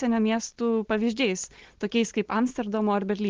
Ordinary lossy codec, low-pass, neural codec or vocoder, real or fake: Opus, 16 kbps; 7.2 kHz; none; real